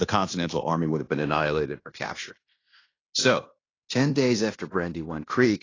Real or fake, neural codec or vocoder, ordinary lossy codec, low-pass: fake; codec, 16 kHz in and 24 kHz out, 0.9 kbps, LongCat-Audio-Codec, fine tuned four codebook decoder; AAC, 32 kbps; 7.2 kHz